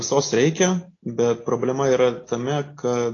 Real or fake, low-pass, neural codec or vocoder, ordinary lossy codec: real; 7.2 kHz; none; AAC, 32 kbps